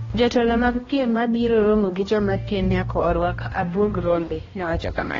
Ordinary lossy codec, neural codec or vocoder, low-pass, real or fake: AAC, 24 kbps; codec, 16 kHz, 1 kbps, X-Codec, HuBERT features, trained on balanced general audio; 7.2 kHz; fake